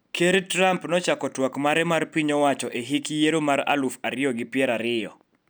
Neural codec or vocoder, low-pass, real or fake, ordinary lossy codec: none; none; real; none